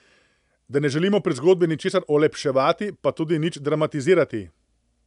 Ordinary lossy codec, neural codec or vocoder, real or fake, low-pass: none; none; real; 10.8 kHz